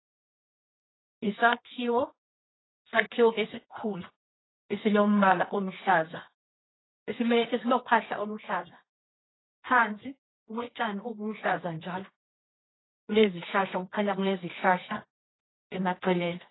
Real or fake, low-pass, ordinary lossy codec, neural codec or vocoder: fake; 7.2 kHz; AAC, 16 kbps; codec, 24 kHz, 0.9 kbps, WavTokenizer, medium music audio release